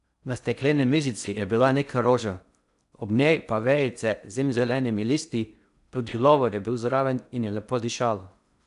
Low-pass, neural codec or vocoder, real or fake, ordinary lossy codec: 10.8 kHz; codec, 16 kHz in and 24 kHz out, 0.6 kbps, FocalCodec, streaming, 4096 codes; fake; none